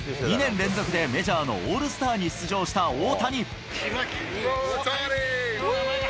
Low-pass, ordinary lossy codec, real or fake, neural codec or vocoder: none; none; real; none